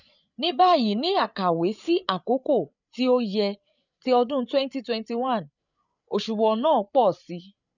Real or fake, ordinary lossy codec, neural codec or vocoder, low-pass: fake; none; codec, 16 kHz, 8 kbps, FreqCodec, larger model; 7.2 kHz